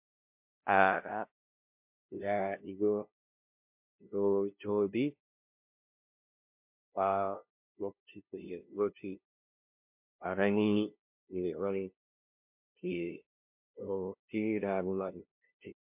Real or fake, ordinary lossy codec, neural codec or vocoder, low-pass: fake; MP3, 32 kbps; codec, 16 kHz, 0.5 kbps, FunCodec, trained on LibriTTS, 25 frames a second; 3.6 kHz